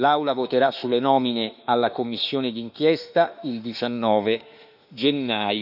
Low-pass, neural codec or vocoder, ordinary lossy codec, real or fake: 5.4 kHz; autoencoder, 48 kHz, 32 numbers a frame, DAC-VAE, trained on Japanese speech; none; fake